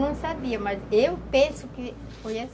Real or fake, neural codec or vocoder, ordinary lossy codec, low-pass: real; none; none; none